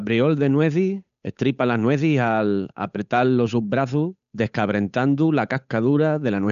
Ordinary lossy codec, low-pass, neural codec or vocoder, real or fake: none; 7.2 kHz; codec, 16 kHz, 8 kbps, FunCodec, trained on Chinese and English, 25 frames a second; fake